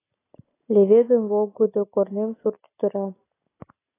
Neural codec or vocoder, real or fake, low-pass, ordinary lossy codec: none; real; 3.6 kHz; AAC, 16 kbps